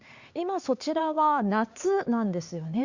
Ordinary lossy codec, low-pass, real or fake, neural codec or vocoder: Opus, 64 kbps; 7.2 kHz; fake; codec, 16 kHz, 4 kbps, X-Codec, HuBERT features, trained on LibriSpeech